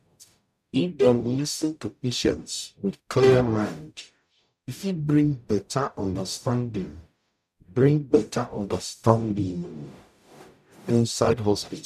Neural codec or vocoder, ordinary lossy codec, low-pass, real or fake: codec, 44.1 kHz, 0.9 kbps, DAC; none; 14.4 kHz; fake